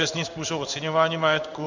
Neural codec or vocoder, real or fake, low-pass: codec, 16 kHz in and 24 kHz out, 1 kbps, XY-Tokenizer; fake; 7.2 kHz